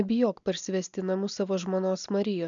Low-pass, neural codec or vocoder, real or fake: 7.2 kHz; none; real